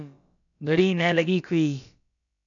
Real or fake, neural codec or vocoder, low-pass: fake; codec, 16 kHz, about 1 kbps, DyCAST, with the encoder's durations; 7.2 kHz